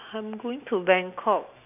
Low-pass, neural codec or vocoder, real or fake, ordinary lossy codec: 3.6 kHz; none; real; none